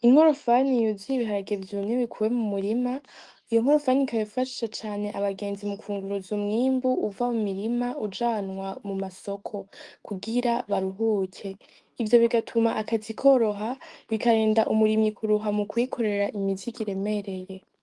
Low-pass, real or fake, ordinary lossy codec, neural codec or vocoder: 10.8 kHz; fake; Opus, 24 kbps; autoencoder, 48 kHz, 128 numbers a frame, DAC-VAE, trained on Japanese speech